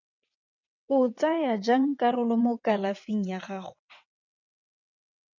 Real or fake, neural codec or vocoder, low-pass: fake; vocoder, 22.05 kHz, 80 mel bands, WaveNeXt; 7.2 kHz